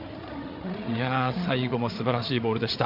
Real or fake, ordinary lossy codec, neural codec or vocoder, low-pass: fake; MP3, 48 kbps; codec, 16 kHz, 8 kbps, FreqCodec, larger model; 5.4 kHz